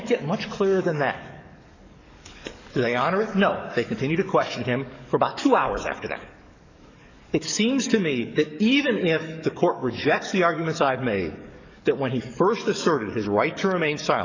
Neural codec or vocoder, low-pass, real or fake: codec, 44.1 kHz, 7.8 kbps, DAC; 7.2 kHz; fake